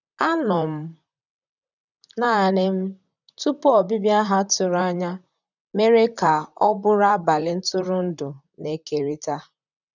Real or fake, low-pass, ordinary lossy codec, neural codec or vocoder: fake; 7.2 kHz; none; vocoder, 44.1 kHz, 128 mel bands, Pupu-Vocoder